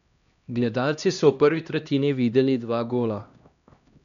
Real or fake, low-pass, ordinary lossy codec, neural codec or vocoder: fake; 7.2 kHz; none; codec, 16 kHz, 1 kbps, X-Codec, HuBERT features, trained on LibriSpeech